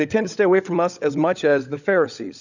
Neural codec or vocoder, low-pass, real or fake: codec, 16 kHz, 16 kbps, FunCodec, trained on LibriTTS, 50 frames a second; 7.2 kHz; fake